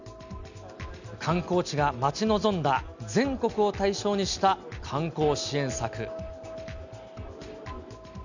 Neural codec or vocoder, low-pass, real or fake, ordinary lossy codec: none; 7.2 kHz; real; none